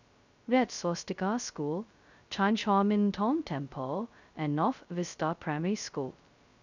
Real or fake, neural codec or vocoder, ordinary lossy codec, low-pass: fake; codec, 16 kHz, 0.2 kbps, FocalCodec; none; 7.2 kHz